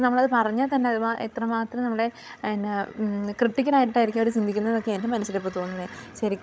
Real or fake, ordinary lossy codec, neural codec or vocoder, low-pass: fake; none; codec, 16 kHz, 16 kbps, FreqCodec, larger model; none